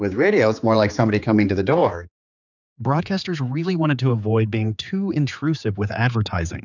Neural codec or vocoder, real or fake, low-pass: codec, 16 kHz, 4 kbps, X-Codec, HuBERT features, trained on general audio; fake; 7.2 kHz